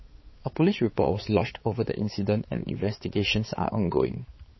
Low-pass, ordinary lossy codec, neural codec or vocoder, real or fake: 7.2 kHz; MP3, 24 kbps; codec, 16 kHz, 4 kbps, X-Codec, HuBERT features, trained on balanced general audio; fake